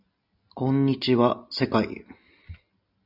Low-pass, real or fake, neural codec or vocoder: 5.4 kHz; real; none